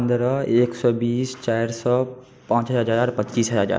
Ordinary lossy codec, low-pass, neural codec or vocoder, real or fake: none; none; none; real